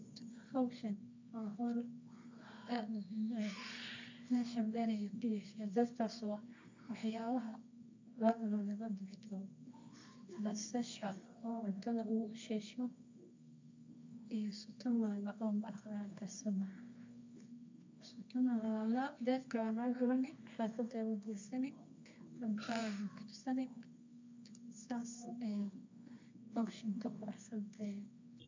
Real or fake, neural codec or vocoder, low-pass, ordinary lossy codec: fake; codec, 24 kHz, 0.9 kbps, WavTokenizer, medium music audio release; 7.2 kHz; MP3, 64 kbps